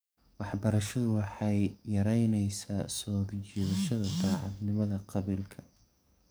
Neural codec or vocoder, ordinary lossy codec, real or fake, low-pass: codec, 44.1 kHz, 7.8 kbps, DAC; none; fake; none